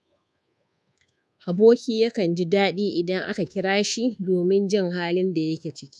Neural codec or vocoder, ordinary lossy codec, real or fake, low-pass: codec, 24 kHz, 1.2 kbps, DualCodec; none; fake; none